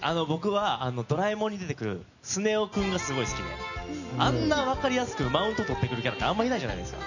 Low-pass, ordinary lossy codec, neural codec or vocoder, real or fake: 7.2 kHz; none; none; real